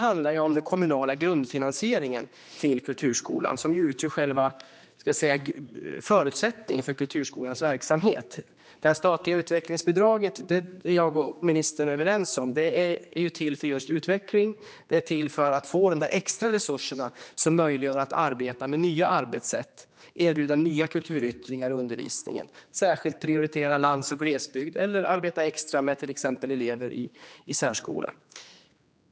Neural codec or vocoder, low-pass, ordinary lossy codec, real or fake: codec, 16 kHz, 2 kbps, X-Codec, HuBERT features, trained on general audio; none; none; fake